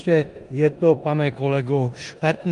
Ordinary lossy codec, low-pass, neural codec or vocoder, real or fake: Opus, 32 kbps; 10.8 kHz; codec, 16 kHz in and 24 kHz out, 0.9 kbps, LongCat-Audio-Codec, four codebook decoder; fake